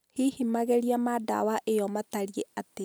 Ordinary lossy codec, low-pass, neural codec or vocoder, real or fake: none; none; none; real